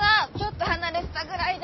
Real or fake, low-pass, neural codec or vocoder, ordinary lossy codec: real; 7.2 kHz; none; MP3, 24 kbps